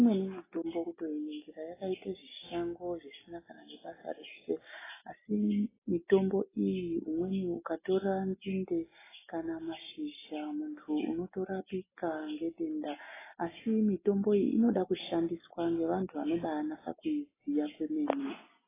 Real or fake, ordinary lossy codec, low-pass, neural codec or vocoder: real; AAC, 16 kbps; 3.6 kHz; none